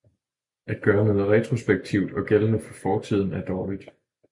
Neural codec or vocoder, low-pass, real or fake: none; 10.8 kHz; real